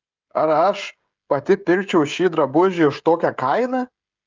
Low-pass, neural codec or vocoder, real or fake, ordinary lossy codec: 7.2 kHz; codec, 16 kHz, 16 kbps, FreqCodec, smaller model; fake; Opus, 24 kbps